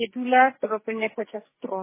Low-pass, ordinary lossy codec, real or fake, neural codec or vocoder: 3.6 kHz; MP3, 16 kbps; fake; codec, 44.1 kHz, 2.6 kbps, SNAC